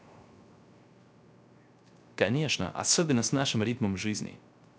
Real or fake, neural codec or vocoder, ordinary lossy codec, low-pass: fake; codec, 16 kHz, 0.3 kbps, FocalCodec; none; none